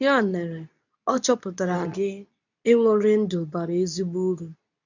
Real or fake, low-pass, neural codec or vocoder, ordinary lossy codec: fake; 7.2 kHz; codec, 24 kHz, 0.9 kbps, WavTokenizer, medium speech release version 2; none